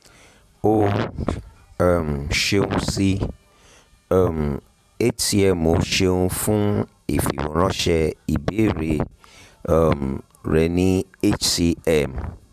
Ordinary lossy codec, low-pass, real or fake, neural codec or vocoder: none; 14.4 kHz; fake; vocoder, 44.1 kHz, 128 mel bands every 512 samples, BigVGAN v2